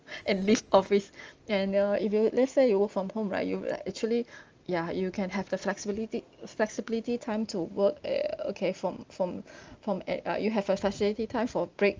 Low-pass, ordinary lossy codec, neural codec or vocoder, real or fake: 7.2 kHz; Opus, 24 kbps; none; real